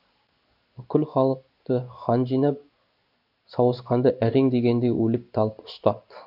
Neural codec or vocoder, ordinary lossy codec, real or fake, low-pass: codec, 16 kHz in and 24 kHz out, 1 kbps, XY-Tokenizer; none; fake; 5.4 kHz